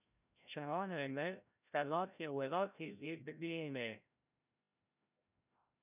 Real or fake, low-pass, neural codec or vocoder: fake; 3.6 kHz; codec, 16 kHz, 0.5 kbps, FreqCodec, larger model